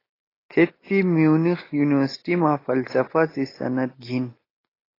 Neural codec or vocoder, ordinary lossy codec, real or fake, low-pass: none; AAC, 24 kbps; real; 5.4 kHz